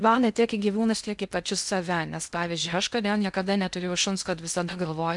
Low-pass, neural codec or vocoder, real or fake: 10.8 kHz; codec, 16 kHz in and 24 kHz out, 0.6 kbps, FocalCodec, streaming, 2048 codes; fake